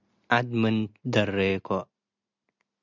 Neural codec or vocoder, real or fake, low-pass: none; real; 7.2 kHz